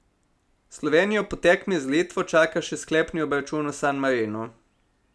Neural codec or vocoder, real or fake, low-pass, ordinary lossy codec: none; real; none; none